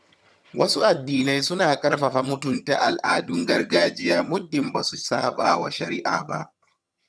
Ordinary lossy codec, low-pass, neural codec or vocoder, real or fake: none; none; vocoder, 22.05 kHz, 80 mel bands, HiFi-GAN; fake